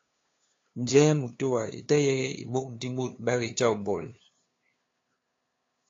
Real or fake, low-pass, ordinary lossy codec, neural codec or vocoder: fake; 7.2 kHz; AAC, 32 kbps; codec, 16 kHz, 2 kbps, FunCodec, trained on LibriTTS, 25 frames a second